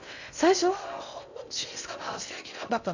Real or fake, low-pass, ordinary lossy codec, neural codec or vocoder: fake; 7.2 kHz; none; codec, 16 kHz in and 24 kHz out, 0.6 kbps, FocalCodec, streaming, 2048 codes